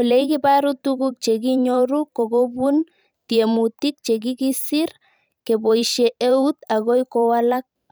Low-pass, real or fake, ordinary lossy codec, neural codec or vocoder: none; fake; none; vocoder, 44.1 kHz, 128 mel bands every 512 samples, BigVGAN v2